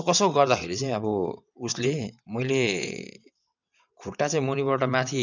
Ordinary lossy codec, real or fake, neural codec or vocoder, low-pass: none; fake; vocoder, 22.05 kHz, 80 mel bands, WaveNeXt; 7.2 kHz